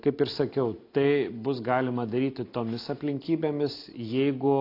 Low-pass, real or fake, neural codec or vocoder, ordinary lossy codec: 5.4 kHz; real; none; AAC, 32 kbps